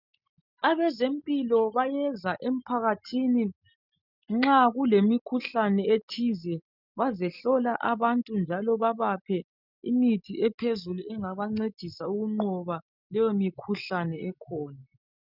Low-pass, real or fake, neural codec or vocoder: 5.4 kHz; real; none